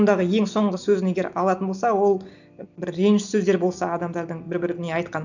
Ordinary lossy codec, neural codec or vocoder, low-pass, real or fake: none; none; 7.2 kHz; real